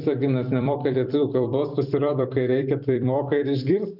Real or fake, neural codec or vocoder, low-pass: fake; vocoder, 44.1 kHz, 128 mel bands every 256 samples, BigVGAN v2; 5.4 kHz